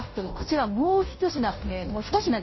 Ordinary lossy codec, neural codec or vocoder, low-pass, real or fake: MP3, 24 kbps; codec, 16 kHz, 0.5 kbps, FunCodec, trained on Chinese and English, 25 frames a second; 7.2 kHz; fake